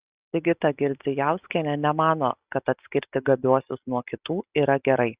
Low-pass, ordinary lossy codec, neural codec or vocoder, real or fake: 3.6 kHz; Opus, 64 kbps; none; real